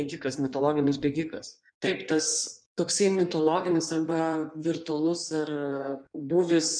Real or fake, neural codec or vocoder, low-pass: fake; codec, 16 kHz in and 24 kHz out, 1.1 kbps, FireRedTTS-2 codec; 9.9 kHz